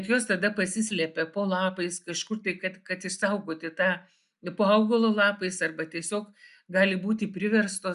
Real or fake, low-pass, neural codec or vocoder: real; 10.8 kHz; none